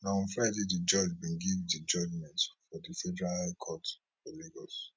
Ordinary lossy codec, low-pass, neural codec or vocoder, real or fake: none; none; none; real